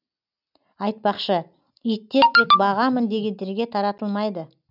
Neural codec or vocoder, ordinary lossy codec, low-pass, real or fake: none; none; 5.4 kHz; real